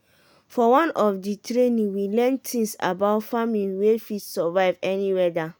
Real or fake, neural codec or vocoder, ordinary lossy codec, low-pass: real; none; none; none